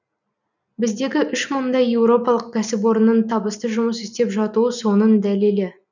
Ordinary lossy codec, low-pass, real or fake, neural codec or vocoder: none; 7.2 kHz; real; none